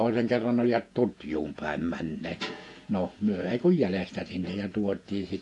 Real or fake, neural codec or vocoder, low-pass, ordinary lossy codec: fake; vocoder, 48 kHz, 128 mel bands, Vocos; 10.8 kHz; AAC, 48 kbps